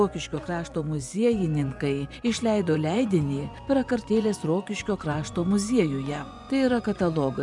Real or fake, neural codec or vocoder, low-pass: fake; vocoder, 24 kHz, 100 mel bands, Vocos; 10.8 kHz